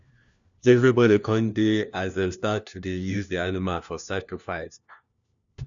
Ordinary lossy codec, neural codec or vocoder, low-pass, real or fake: none; codec, 16 kHz, 1 kbps, FunCodec, trained on LibriTTS, 50 frames a second; 7.2 kHz; fake